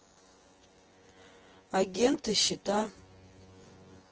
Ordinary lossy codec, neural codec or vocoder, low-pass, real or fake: Opus, 16 kbps; vocoder, 24 kHz, 100 mel bands, Vocos; 7.2 kHz; fake